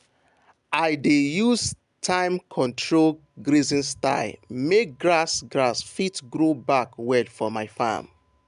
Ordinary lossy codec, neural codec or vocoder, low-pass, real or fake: MP3, 96 kbps; none; 10.8 kHz; real